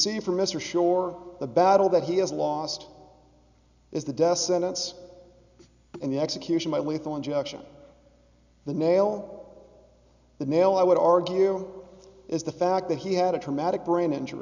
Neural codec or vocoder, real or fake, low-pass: none; real; 7.2 kHz